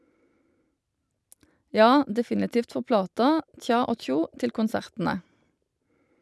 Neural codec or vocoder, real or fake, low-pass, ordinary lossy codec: none; real; none; none